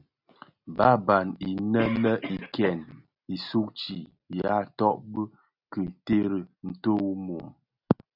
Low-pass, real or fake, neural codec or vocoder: 5.4 kHz; real; none